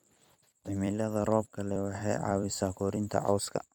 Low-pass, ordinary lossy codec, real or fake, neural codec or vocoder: none; none; fake; vocoder, 44.1 kHz, 128 mel bands every 256 samples, BigVGAN v2